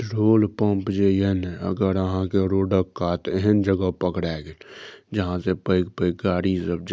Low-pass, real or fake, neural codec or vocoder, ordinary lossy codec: none; real; none; none